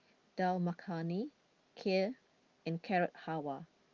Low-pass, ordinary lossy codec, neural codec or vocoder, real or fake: 7.2 kHz; Opus, 32 kbps; none; real